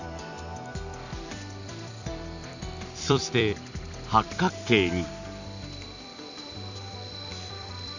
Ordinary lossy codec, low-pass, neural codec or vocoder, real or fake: none; 7.2 kHz; vocoder, 44.1 kHz, 128 mel bands every 256 samples, BigVGAN v2; fake